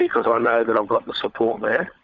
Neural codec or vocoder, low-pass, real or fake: codec, 16 kHz, 16 kbps, FunCodec, trained on LibriTTS, 50 frames a second; 7.2 kHz; fake